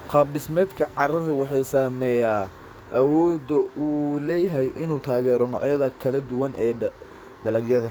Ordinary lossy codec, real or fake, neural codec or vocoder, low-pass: none; fake; codec, 44.1 kHz, 2.6 kbps, SNAC; none